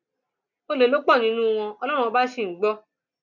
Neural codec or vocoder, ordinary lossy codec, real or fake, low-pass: none; none; real; 7.2 kHz